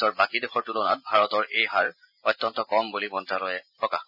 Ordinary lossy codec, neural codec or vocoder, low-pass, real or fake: none; none; 5.4 kHz; real